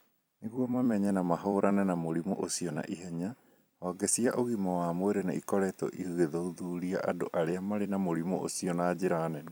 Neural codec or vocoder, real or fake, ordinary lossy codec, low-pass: vocoder, 44.1 kHz, 128 mel bands every 256 samples, BigVGAN v2; fake; none; none